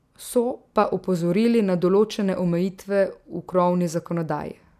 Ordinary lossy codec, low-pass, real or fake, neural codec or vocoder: none; 14.4 kHz; real; none